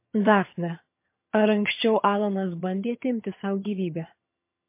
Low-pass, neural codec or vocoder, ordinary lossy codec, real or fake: 3.6 kHz; vocoder, 22.05 kHz, 80 mel bands, HiFi-GAN; MP3, 24 kbps; fake